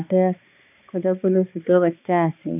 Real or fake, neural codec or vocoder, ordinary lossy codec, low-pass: fake; codec, 16 kHz, 2 kbps, X-Codec, HuBERT features, trained on balanced general audio; none; 3.6 kHz